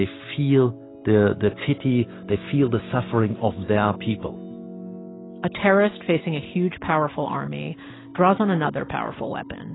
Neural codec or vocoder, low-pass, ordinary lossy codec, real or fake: none; 7.2 kHz; AAC, 16 kbps; real